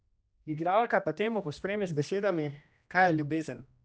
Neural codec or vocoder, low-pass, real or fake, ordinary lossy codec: codec, 16 kHz, 1 kbps, X-Codec, HuBERT features, trained on general audio; none; fake; none